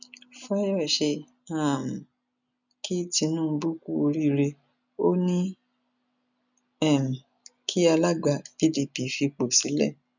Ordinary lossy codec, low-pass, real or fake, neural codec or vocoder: none; 7.2 kHz; real; none